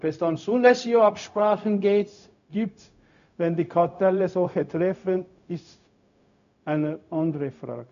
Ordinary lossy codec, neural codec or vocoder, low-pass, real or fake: none; codec, 16 kHz, 0.4 kbps, LongCat-Audio-Codec; 7.2 kHz; fake